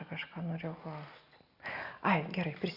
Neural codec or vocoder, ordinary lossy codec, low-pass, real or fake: none; Opus, 64 kbps; 5.4 kHz; real